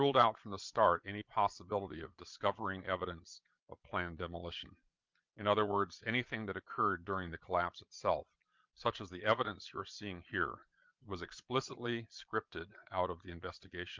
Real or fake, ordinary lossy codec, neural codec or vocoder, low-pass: fake; Opus, 24 kbps; vocoder, 22.05 kHz, 80 mel bands, Vocos; 7.2 kHz